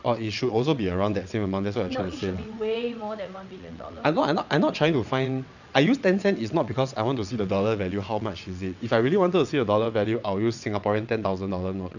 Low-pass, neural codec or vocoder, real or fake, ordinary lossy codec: 7.2 kHz; vocoder, 22.05 kHz, 80 mel bands, WaveNeXt; fake; none